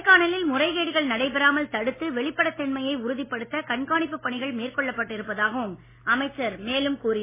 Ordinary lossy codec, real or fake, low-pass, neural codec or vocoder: MP3, 16 kbps; real; 3.6 kHz; none